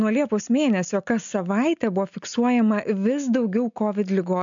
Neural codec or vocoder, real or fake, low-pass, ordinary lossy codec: none; real; 7.2 kHz; MP3, 64 kbps